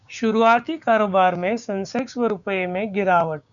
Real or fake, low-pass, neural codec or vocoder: fake; 7.2 kHz; codec, 16 kHz, 6 kbps, DAC